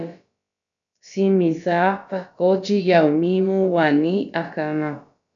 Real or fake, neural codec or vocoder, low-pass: fake; codec, 16 kHz, about 1 kbps, DyCAST, with the encoder's durations; 7.2 kHz